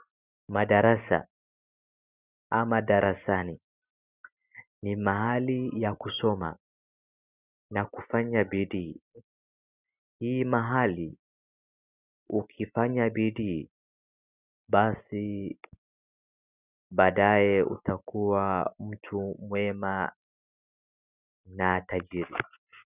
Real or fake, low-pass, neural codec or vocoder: real; 3.6 kHz; none